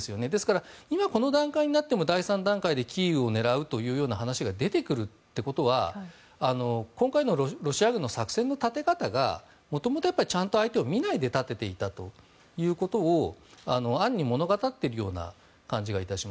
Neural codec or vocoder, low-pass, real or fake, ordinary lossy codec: none; none; real; none